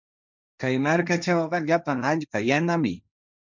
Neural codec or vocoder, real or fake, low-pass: codec, 16 kHz, 1.1 kbps, Voila-Tokenizer; fake; 7.2 kHz